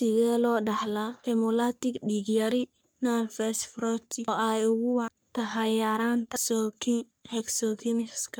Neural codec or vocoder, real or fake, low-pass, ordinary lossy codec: codec, 44.1 kHz, 3.4 kbps, Pupu-Codec; fake; none; none